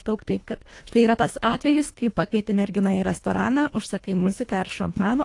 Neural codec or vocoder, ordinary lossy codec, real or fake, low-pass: codec, 24 kHz, 1.5 kbps, HILCodec; AAC, 48 kbps; fake; 10.8 kHz